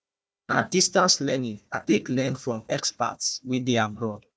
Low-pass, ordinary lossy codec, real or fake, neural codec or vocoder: none; none; fake; codec, 16 kHz, 1 kbps, FunCodec, trained on Chinese and English, 50 frames a second